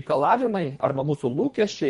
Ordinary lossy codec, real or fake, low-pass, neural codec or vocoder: MP3, 48 kbps; fake; 10.8 kHz; codec, 24 kHz, 1.5 kbps, HILCodec